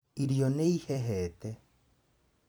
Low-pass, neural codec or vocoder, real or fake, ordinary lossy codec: none; vocoder, 44.1 kHz, 128 mel bands every 256 samples, BigVGAN v2; fake; none